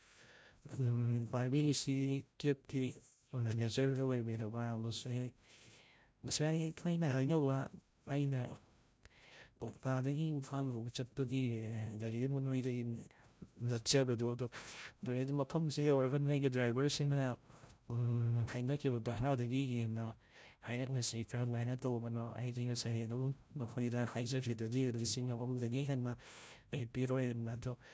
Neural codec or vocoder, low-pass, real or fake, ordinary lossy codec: codec, 16 kHz, 0.5 kbps, FreqCodec, larger model; none; fake; none